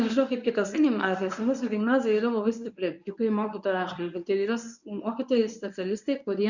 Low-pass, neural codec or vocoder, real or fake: 7.2 kHz; codec, 24 kHz, 0.9 kbps, WavTokenizer, medium speech release version 1; fake